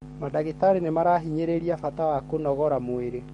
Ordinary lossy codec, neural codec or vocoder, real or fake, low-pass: MP3, 48 kbps; codec, 44.1 kHz, 7.8 kbps, DAC; fake; 19.8 kHz